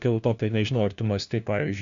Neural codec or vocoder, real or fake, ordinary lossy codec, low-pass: codec, 16 kHz, 1 kbps, FunCodec, trained on LibriTTS, 50 frames a second; fake; Opus, 64 kbps; 7.2 kHz